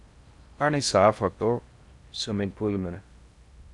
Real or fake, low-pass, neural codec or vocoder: fake; 10.8 kHz; codec, 16 kHz in and 24 kHz out, 0.6 kbps, FocalCodec, streaming, 4096 codes